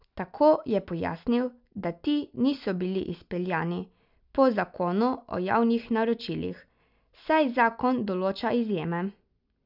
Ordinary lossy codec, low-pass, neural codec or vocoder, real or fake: none; 5.4 kHz; none; real